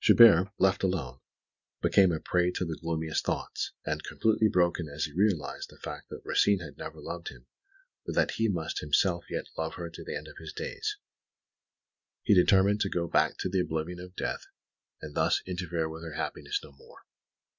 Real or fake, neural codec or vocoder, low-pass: real; none; 7.2 kHz